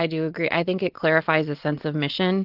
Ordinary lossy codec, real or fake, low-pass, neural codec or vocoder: Opus, 16 kbps; real; 5.4 kHz; none